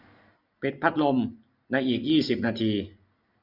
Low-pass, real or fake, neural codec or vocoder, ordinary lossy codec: 5.4 kHz; real; none; none